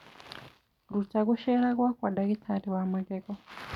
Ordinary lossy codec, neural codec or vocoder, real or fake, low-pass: none; none; real; 19.8 kHz